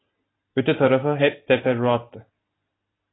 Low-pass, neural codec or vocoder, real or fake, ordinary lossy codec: 7.2 kHz; none; real; AAC, 16 kbps